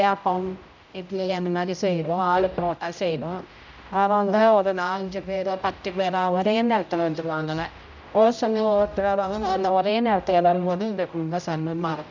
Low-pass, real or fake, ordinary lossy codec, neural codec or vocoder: 7.2 kHz; fake; none; codec, 16 kHz, 0.5 kbps, X-Codec, HuBERT features, trained on general audio